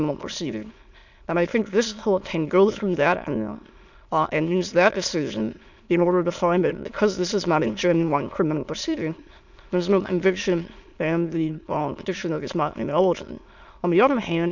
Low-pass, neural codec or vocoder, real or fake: 7.2 kHz; autoencoder, 22.05 kHz, a latent of 192 numbers a frame, VITS, trained on many speakers; fake